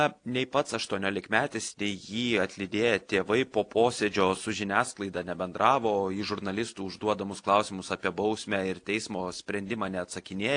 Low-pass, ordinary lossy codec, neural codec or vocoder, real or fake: 9.9 kHz; AAC, 48 kbps; none; real